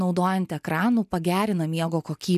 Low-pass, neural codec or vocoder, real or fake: 14.4 kHz; none; real